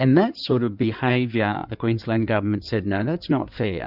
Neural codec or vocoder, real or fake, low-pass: codec, 16 kHz in and 24 kHz out, 2.2 kbps, FireRedTTS-2 codec; fake; 5.4 kHz